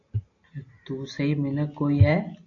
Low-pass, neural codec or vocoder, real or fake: 7.2 kHz; none; real